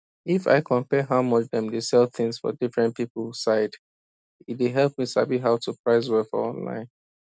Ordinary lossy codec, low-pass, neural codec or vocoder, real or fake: none; none; none; real